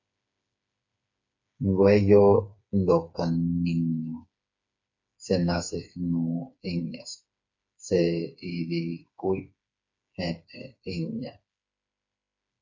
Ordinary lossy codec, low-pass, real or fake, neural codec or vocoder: MP3, 64 kbps; 7.2 kHz; fake; codec, 16 kHz, 4 kbps, FreqCodec, smaller model